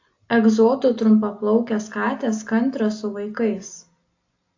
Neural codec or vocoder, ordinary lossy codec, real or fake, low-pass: none; AAC, 48 kbps; real; 7.2 kHz